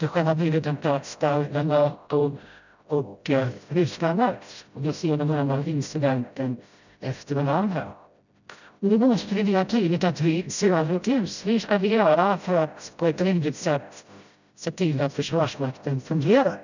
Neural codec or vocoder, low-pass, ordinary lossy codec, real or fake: codec, 16 kHz, 0.5 kbps, FreqCodec, smaller model; 7.2 kHz; none; fake